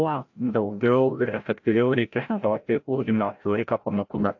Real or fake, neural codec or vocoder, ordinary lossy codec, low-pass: fake; codec, 16 kHz, 0.5 kbps, FreqCodec, larger model; AAC, 48 kbps; 7.2 kHz